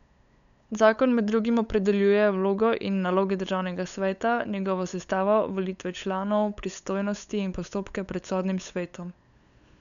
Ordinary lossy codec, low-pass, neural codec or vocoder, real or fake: none; 7.2 kHz; codec, 16 kHz, 8 kbps, FunCodec, trained on LibriTTS, 25 frames a second; fake